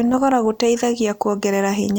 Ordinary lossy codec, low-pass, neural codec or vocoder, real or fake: none; none; none; real